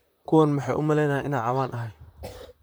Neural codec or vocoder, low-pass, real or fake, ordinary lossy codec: vocoder, 44.1 kHz, 128 mel bands, Pupu-Vocoder; none; fake; none